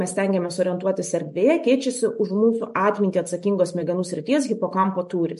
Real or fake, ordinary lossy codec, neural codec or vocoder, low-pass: real; MP3, 48 kbps; none; 14.4 kHz